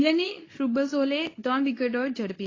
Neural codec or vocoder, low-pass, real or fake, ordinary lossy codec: codec, 24 kHz, 0.9 kbps, WavTokenizer, medium speech release version 2; 7.2 kHz; fake; AAC, 32 kbps